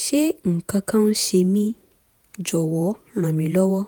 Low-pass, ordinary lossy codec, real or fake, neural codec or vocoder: none; none; fake; vocoder, 48 kHz, 128 mel bands, Vocos